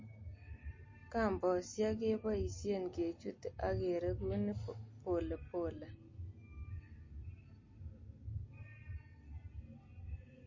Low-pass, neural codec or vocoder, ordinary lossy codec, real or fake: 7.2 kHz; none; MP3, 32 kbps; real